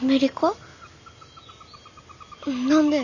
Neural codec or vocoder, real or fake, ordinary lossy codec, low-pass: none; real; none; 7.2 kHz